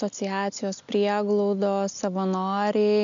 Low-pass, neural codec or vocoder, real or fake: 7.2 kHz; none; real